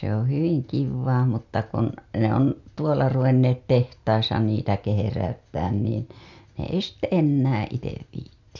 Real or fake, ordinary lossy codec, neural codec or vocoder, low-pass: real; MP3, 64 kbps; none; 7.2 kHz